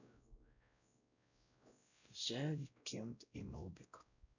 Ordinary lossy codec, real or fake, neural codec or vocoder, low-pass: none; fake; codec, 16 kHz, 0.5 kbps, X-Codec, WavLM features, trained on Multilingual LibriSpeech; 7.2 kHz